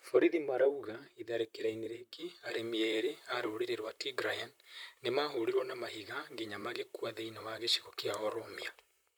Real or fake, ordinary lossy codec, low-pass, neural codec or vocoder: fake; none; none; vocoder, 44.1 kHz, 128 mel bands, Pupu-Vocoder